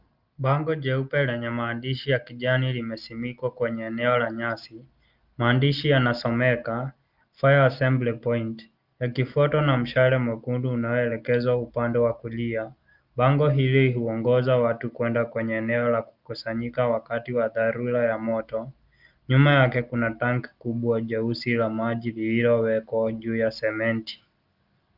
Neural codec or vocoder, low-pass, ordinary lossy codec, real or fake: none; 5.4 kHz; Opus, 32 kbps; real